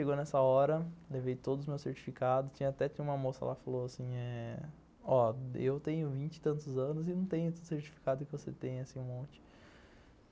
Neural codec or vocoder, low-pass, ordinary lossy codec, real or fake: none; none; none; real